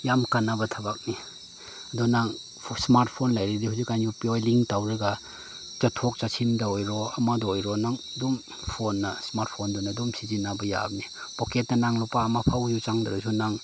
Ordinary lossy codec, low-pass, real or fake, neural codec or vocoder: none; none; real; none